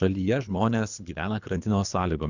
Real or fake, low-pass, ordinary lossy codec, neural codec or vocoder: fake; 7.2 kHz; Opus, 64 kbps; codec, 24 kHz, 3 kbps, HILCodec